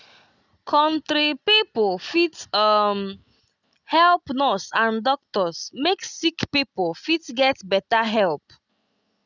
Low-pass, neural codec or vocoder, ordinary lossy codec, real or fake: 7.2 kHz; none; none; real